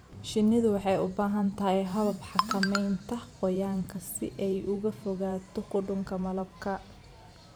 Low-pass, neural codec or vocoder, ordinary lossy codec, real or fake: none; vocoder, 44.1 kHz, 128 mel bands every 512 samples, BigVGAN v2; none; fake